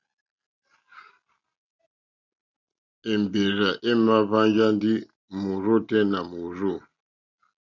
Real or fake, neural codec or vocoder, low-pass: real; none; 7.2 kHz